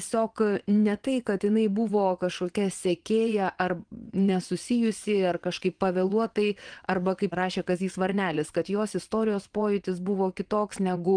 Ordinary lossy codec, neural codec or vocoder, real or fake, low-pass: Opus, 24 kbps; vocoder, 22.05 kHz, 80 mel bands, WaveNeXt; fake; 9.9 kHz